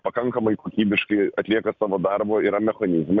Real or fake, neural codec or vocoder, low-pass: real; none; 7.2 kHz